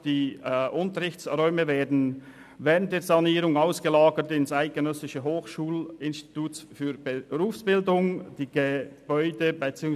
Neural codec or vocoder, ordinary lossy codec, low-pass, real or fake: none; none; 14.4 kHz; real